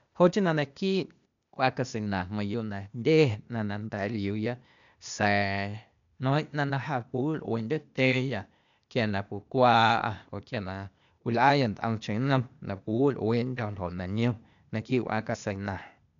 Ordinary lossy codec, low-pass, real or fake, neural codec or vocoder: MP3, 96 kbps; 7.2 kHz; fake; codec, 16 kHz, 0.8 kbps, ZipCodec